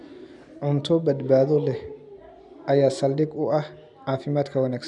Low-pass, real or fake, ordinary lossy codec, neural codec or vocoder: 10.8 kHz; real; none; none